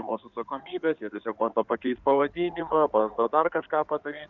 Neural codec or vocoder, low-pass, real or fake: codec, 16 kHz in and 24 kHz out, 2.2 kbps, FireRedTTS-2 codec; 7.2 kHz; fake